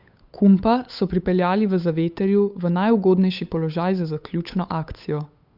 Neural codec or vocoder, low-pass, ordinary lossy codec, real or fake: codec, 16 kHz, 8 kbps, FunCodec, trained on Chinese and English, 25 frames a second; 5.4 kHz; Opus, 64 kbps; fake